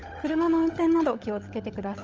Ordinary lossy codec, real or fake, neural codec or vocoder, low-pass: Opus, 24 kbps; fake; codec, 16 kHz, 16 kbps, FunCodec, trained on LibriTTS, 50 frames a second; 7.2 kHz